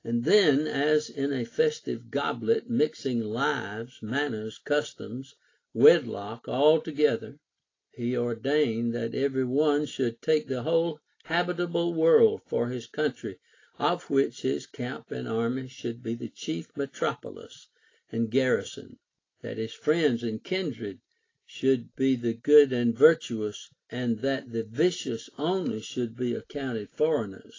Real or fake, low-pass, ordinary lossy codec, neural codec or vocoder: real; 7.2 kHz; AAC, 32 kbps; none